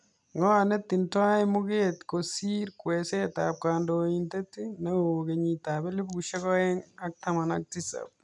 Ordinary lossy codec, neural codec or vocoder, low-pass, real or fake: none; none; 10.8 kHz; real